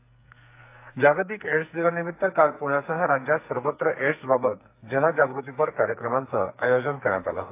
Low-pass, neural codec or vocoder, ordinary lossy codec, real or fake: 3.6 kHz; codec, 44.1 kHz, 2.6 kbps, SNAC; AAC, 24 kbps; fake